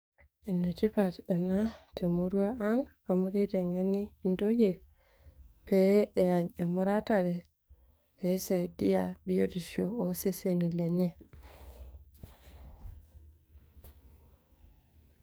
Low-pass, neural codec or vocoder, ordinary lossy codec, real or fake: none; codec, 44.1 kHz, 2.6 kbps, SNAC; none; fake